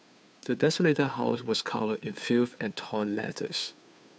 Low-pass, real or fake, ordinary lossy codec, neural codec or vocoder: none; fake; none; codec, 16 kHz, 2 kbps, FunCodec, trained on Chinese and English, 25 frames a second